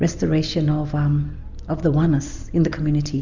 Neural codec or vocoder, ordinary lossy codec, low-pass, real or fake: none; Opus, 64 kbps; 7.2 kHz; real